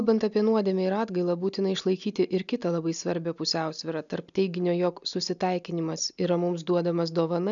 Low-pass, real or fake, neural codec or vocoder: 7.2 kHz; real; none